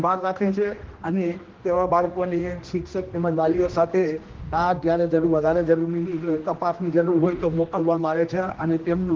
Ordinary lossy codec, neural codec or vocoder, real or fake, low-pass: Opus, 16 kbps; codec, 16 kHz, 1 kbps, X-Codec, HuBERT features, trained on general audio; fake; 7.2 kHz